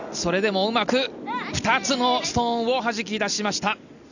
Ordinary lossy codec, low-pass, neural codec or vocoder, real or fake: none; 7.2 kHz; none; real